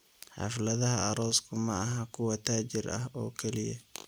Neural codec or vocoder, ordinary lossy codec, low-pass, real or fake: none; none; none; real